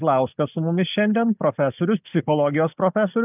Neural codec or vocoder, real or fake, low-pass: none; real; 3.6 kHz